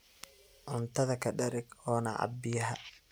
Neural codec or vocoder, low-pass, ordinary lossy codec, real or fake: none; none; none; real